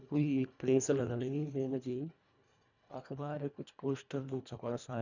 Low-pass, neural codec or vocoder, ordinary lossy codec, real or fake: 7.2 kHz; codec, 24 kHz, 1.5 kbps, HILCodec; none; fake